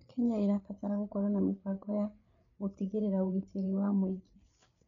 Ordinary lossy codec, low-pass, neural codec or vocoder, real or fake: none; 7.2 kHz; codec, 16 kHz, 8 kbps, FreqCodec, larger model; fake